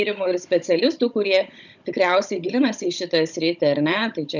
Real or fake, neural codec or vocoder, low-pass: fake; codec, 16 kHz, 16 kbps, FunCodec, trained on Chinese and English, 50 frames a second; 7.2 kHz